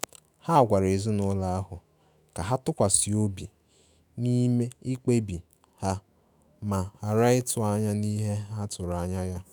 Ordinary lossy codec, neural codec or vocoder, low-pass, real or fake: none; autoencoder, 48 kHz, 128 numbers a frame, DAC-VAE, trained on Japanese speech; none; fake